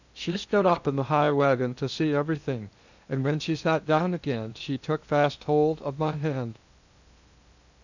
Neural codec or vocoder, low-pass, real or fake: codec, 16 kHz in and 24 kHz out, 0.6 kbps, FocalCodec, streaming, 2048 codes; 7.2 kHz; fake